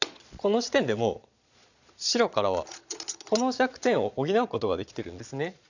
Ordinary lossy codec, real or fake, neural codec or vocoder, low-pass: none; fake; vocoder, 22.05 kHz, 80 mel bands, Vocos; 7.2 kHz